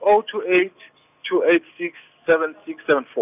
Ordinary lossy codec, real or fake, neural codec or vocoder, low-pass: none; real; none; 3.6 kHz